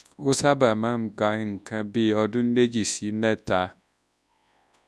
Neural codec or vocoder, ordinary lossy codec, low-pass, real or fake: codec, 24 kHz, 0.9 kbps, WavTokenizer, large speech release; none; none; fake